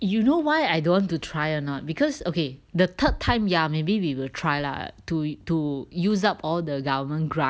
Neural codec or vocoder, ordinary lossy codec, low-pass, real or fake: none; none; none; real